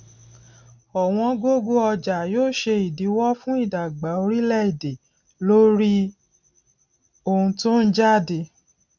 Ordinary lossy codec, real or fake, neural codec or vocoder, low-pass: none; real; none; 7.2 kHz